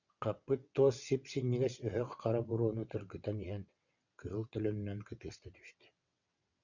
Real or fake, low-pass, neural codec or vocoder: fake; 7.2 kHz; vocoder, 22.05 kHz, 80 mel bands, WaveNeXt